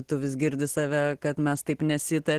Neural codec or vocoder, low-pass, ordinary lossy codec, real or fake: none; 14.4 kHz; Opus, 16 kbps; real